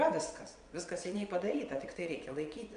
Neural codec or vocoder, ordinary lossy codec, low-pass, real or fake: vocoder, 22.05 kHz, 80 mel bands, WaveNeXt; MP3, 64 kbps; 9.9 kHz; fake